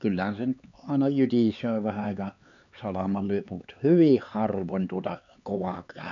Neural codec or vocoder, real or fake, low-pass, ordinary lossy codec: codec, 16 kHz, 4 kbps, X-Codec, HuBERT features, trained on LibriSpeech; fake; 7.2 kHz; none